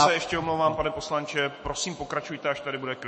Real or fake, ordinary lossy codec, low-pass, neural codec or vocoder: fake; MP3, 32 kbps; 10.8 kHz; autoencoder, 48 kHz, 128 numbers a frame, DAC-VAE, trained on Japanese speech